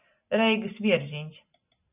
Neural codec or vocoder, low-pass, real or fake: none; 3.6 kHz; real